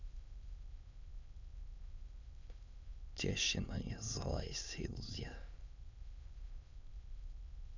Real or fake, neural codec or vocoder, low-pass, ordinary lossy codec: fake; autoencoder, 22.05 kHz, a latent of 192 numbers a frame, VITS, trained on many speakers; 7.2 kHz; none